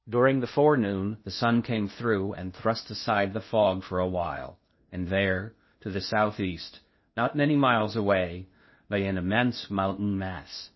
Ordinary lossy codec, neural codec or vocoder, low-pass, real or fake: MP3, 24 kbps; codec, 16 kHz in and 24 kHz out, 0.8 kbps, FocalCodec, streaming, 65536 codes; 7.2 kHz; fake